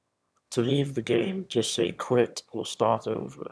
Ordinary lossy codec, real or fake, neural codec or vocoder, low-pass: none; fake; autoencoder, 22.05 kHz, a latent of 192 numbers a frame, VITS, trained on one speaker; none